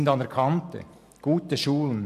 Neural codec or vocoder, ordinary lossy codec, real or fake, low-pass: none; AAC, 96 kbps; real; 14.4 kHz